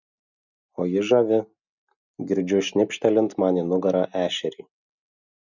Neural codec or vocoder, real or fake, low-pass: none; real; 7.2 kHz